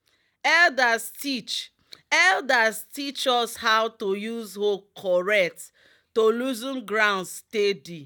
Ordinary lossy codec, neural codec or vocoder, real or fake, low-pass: none; none; real; 19.8 kHz